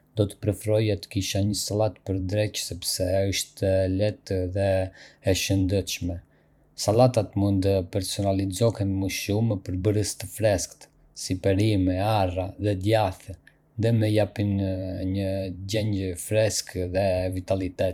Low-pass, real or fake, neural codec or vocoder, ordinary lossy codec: 19.8 kHz; fake; vocoder, 44.1 kHz, 128 mel bands every 512 samples, BigVGAN v2; none